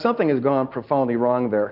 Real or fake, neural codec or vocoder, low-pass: real; none; 5.4 kHz